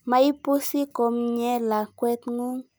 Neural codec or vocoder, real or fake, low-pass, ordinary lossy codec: none; real; none; none